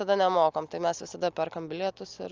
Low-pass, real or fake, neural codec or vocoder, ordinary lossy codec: 7.2 kHz; real; none; Opus, 32 kbps